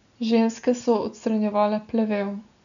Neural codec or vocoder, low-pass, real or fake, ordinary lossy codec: none; 7.2 kHz; real; none